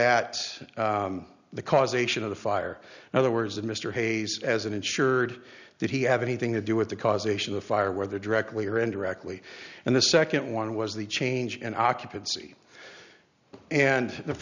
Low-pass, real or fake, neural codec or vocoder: 7.2 kHz; real; none